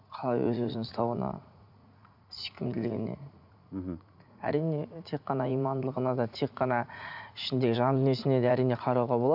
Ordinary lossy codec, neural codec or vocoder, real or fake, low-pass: none; vocoder, 44.1 kHz, 80 mel bands, Vocos; fake; 5.4 kHz